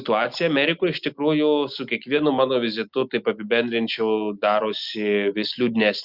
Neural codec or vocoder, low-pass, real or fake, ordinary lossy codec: none; 5.4 kHz; real; Opus, 64 kbps